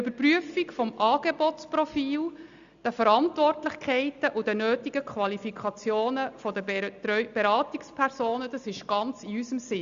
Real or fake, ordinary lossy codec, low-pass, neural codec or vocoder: real; Opus, 64 kbps; 7.2 kHz; none